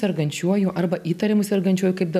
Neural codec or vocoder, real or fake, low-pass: none; real; 14.4 kHz